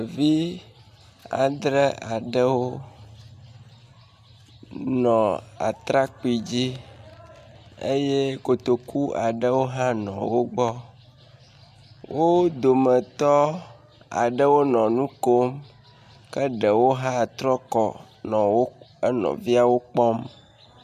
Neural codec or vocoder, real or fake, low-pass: none; real; 14.4 kHz